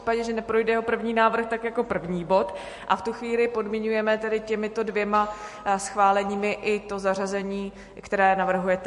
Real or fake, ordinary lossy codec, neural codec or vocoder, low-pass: real; MP3, 48 kbps; none; 14.4 kHz